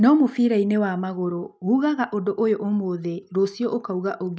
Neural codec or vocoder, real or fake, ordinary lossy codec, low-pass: none; real; none; none